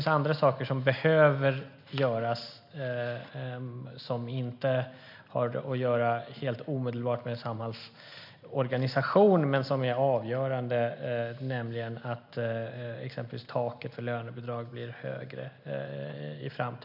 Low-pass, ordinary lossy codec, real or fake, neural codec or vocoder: 5.4 kHz; MP3, 48 kbps; real; none